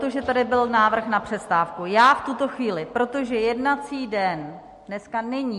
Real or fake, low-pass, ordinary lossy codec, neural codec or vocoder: real; 14.4 kHz; MP3, 48 kbps; none